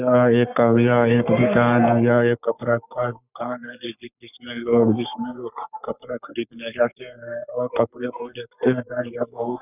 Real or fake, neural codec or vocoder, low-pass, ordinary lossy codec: fake; codec, 44.1 kHz, 3.4 kbps, Pupu-Codec; 3.6 kHz; none